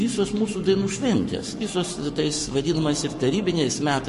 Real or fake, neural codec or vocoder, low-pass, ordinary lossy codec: fake; codec, 44.1 kHz, 7.8 kbps, Pupu-Codec; 14.4 kHz; MP3, 48 kbps